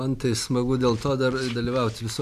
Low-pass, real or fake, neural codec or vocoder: 14.4 kHz; real; none